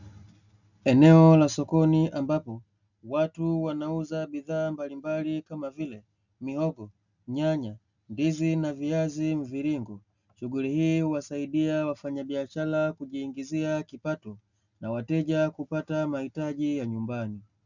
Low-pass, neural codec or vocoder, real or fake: 7.2 kHz; none; real